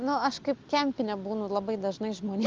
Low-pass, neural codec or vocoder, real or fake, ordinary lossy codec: 7.2 kHz; none; real; Opus, 24 kbps